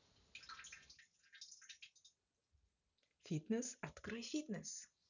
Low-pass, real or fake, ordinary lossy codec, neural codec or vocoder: 7.2 kHz; real; none; none